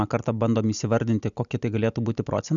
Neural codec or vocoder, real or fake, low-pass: none; real; 7.2 kHz